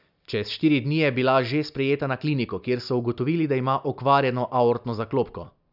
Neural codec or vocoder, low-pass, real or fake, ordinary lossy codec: none; 5.4 kHz; real; none